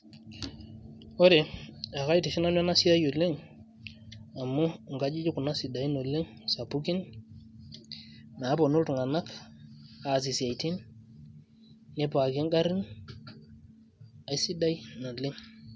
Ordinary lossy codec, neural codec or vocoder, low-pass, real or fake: none; none; none; real